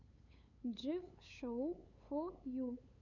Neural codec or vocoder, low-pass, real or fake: codec, 16 kHz, 8 kbps, FunCodec, trained on LibriTTS, 25 frames a second; 7.2 kHz; fake